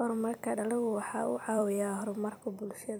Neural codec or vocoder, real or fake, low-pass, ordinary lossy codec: vocoder, 44.1 kHz, 128 mel bands every 256 samples, BigVGAN v2; fake; none; none